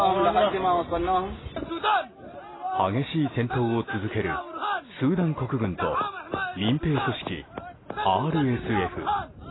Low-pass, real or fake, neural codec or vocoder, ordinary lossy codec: 7.2 kHz; real; none; AAC, 16 kbps